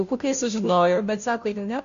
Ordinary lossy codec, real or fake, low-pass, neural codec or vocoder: AAC, 64 kbps; fake; 7.2 kHz; codec, 16 kHz, 0.5 kbps, FunCodec, trained on Chinese and English, 25 frames a second